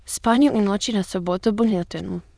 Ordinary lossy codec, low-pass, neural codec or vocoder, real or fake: none; none; autoencoder, 22.05 kHz, a latent of 192 numbers a frame, VITS, trained on many speakers; fake